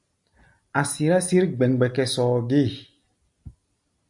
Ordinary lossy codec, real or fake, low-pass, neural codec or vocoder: MP3, 96 kbps; real; 10.8 kHz; none